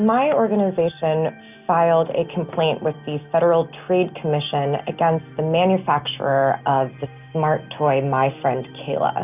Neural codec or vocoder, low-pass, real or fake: none; 3.6 kHz; real